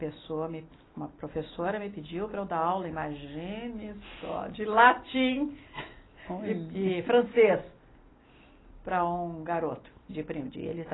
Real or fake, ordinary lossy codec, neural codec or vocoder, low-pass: real; AAC, 16 kbps; none; 7.2 kHz